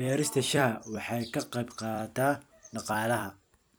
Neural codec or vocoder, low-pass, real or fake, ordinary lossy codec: vocoder, 44.1 kHz, 128 mel bands every 256 samples, BigVGAN v2; none; fake; none